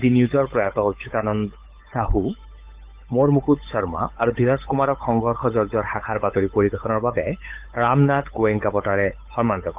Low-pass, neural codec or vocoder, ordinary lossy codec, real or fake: 3.6 kHz; codec, 16 kHz, 8 kbps, FunCodec, trained on Chinese and English, 25 frames a second; Opus, 32 kbps; fake